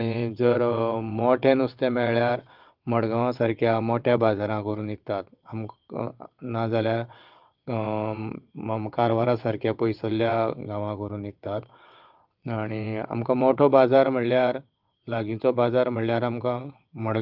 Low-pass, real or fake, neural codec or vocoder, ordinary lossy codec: 5.4 kHz; fake; vocoder, 22.05 kHz, 80 mel bands, WaveNeXt; Opus, 32 kbps